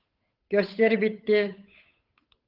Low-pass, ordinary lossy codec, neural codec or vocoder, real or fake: 5.4 kHz; Opus, 32 kbps; codec, 16 kHz, 8 kbps, FunCodec, trained on Chinese and English, 25 frames a second; fake